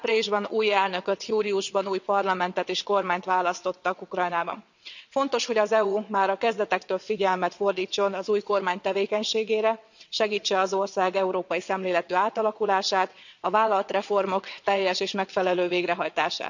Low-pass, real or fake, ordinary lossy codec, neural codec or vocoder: 7.2 kHz; fake; none; vocoder, 22.05 kHz, 80 mel bands, WaveNeXt